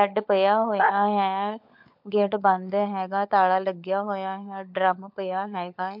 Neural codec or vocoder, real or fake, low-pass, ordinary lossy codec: codec, 16 kHz, 16 kbps, FunCodec, trained on LibriTTS, 50 frames a second; fake; 5.4 kHz; MP3, 48 kbps